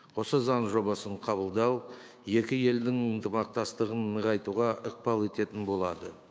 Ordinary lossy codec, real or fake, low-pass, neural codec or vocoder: none; fake; none; codec, 16 kHz, 6 kbps, DAC